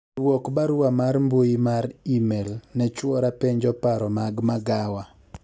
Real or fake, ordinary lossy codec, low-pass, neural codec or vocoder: real; none; none; none